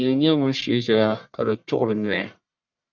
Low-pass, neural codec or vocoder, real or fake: 7.2 kHz; codec, 44.1 kHz, 1.7 kbps, Pupu-Codec; fake